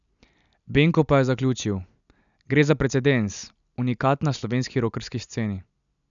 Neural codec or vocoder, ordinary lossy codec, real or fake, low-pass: none; none; real; 7.2 kHz